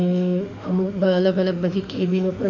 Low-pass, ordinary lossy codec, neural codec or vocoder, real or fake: 7.2 kHz; none; autoencoder, 48 kHz, 32 numbers a frame, DAC-VAE, trained on Japanese speech; fake